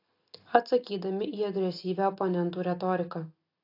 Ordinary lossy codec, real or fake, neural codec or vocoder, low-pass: AAC, 32 kbps; real; none; 5.4 kHz